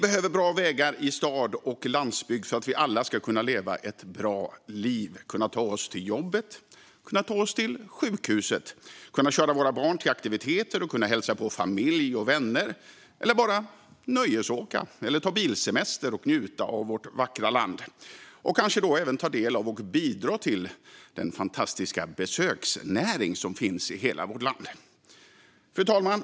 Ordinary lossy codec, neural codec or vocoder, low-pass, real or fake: none; none; none; real